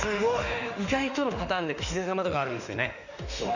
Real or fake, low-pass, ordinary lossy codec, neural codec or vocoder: fake; 7.2 kHz; none; autoencoder, 48 kHz, 32 numbers a frame, DAC-VAE, trained on Japanese speech